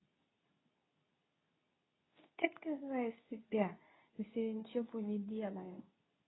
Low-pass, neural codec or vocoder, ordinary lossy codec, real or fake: 7.2 kHz; codec, 24 kHz, 0.9 kbps, WavTokenizer, medium speech release version 2; AAC, 16 kbps; fake